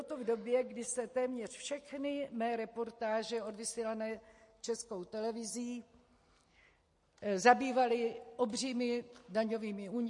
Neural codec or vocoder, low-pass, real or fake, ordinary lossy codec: none; 10.8 kHz; real; MP3, 48 kbps